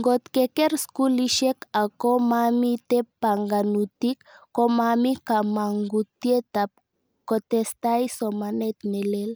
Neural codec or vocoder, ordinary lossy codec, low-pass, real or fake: none; none; none; real